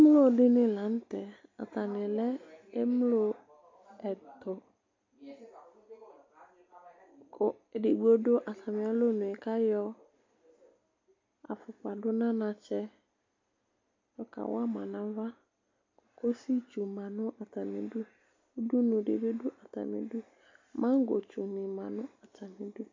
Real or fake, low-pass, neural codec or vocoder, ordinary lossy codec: real; 7.2 kHz; none; MP3, 48 kbps